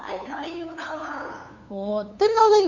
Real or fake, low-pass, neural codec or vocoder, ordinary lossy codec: fake; 7.2 kHz; codec, 16 kHz, 2 kbps, FunCodec, trained on LibriTTS, 25 frames a second; none